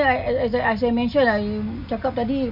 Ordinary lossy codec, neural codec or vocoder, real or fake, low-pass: none; none; real; 5.4 kHz